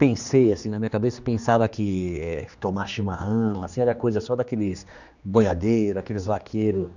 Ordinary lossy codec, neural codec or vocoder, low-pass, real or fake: none; codec, 16 kHz, 2 kbps, X-Codec, HuBERT features, trained on general audio; 7.2 kHz; fake